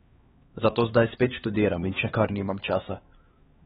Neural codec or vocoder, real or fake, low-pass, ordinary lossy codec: codec, 16 kHz, 2 kbps, X-Codec, HuBERT features, trained on LibriSpeech; fake; 7.2 kHz; AAC, 16 kbps